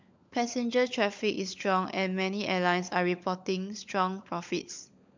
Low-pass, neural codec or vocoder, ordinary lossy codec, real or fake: 7.2 kHz; codec, 16 kHz, 16 kbps, FunCodec, trained on LibriTTS, 50 frames a second; MP3, 64 kbps; fake